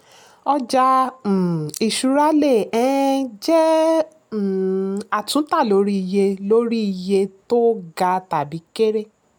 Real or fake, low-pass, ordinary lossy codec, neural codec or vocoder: real; none; none; none